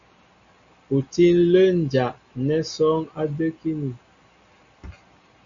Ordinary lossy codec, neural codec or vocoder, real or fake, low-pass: Opus, 64 kbps; none; real; 7.2 kHz